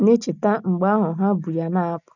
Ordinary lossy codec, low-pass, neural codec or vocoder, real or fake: none; 7.2 kHz; none; real